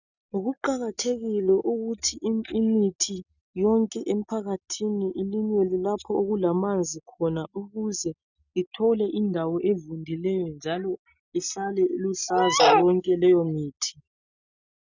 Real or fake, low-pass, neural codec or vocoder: real; 7.2 kHz; none